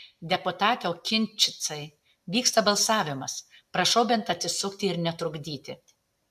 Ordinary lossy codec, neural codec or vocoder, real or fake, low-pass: AAC, 96 kbps; none; real; 14.4 kHz